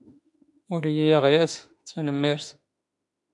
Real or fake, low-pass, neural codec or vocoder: fake; 10.8 kHz; autoencoder, 48 kHz, 32 numbers a frame, DAC-VAE, trained on Japanese speech